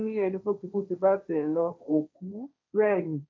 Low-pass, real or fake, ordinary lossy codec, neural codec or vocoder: none; fake; none; codec, 16 kHz, 1.1 kbps, Voila-Tokenizer